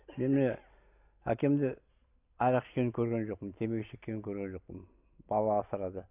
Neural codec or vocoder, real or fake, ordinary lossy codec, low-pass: none; real; none; 3.6 kHz